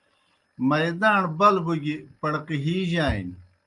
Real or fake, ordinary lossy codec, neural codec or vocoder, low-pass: real; Opus, 32 kbps; none; 10.8 kHz